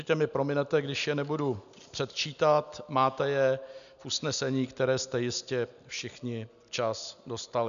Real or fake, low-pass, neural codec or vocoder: real; 7.2 kHz; none